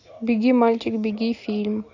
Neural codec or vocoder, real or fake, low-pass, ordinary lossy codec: none; real; 7.2 kHz; AAC, 48 kbps